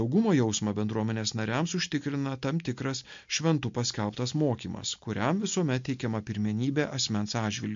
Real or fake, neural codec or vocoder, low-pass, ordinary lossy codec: real; none; 7.2 kHz; MP3, 48 kbps